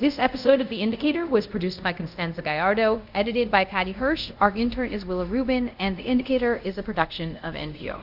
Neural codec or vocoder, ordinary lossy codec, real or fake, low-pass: codec, 24 kHz, 0.5 kbps, DualCodec; Opus, 64 kbps; fake; 5.4 kHz